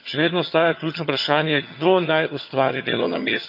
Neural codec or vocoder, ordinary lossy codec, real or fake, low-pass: vocoder, 22.05 kHz, 80 mel bands, HiFi-GAN; none; fake; 5.4 kHz